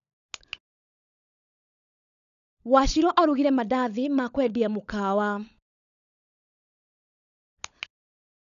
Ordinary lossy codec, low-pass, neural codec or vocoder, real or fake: none; 7.2 kHz; codec, 16 kHz, 16 kbps, FunCodec, trained on LibriTTS, 50 frames a second; fake